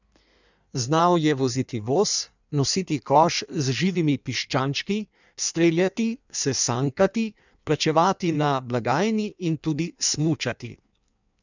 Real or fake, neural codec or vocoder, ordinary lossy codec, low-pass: fake; codec, 16 kHz in and 24 kHz out, 1.1 kbps, FireRedTTS-2 codec; none; 7.2 kHz